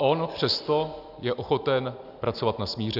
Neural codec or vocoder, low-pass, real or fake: none; 5.4 kHz; real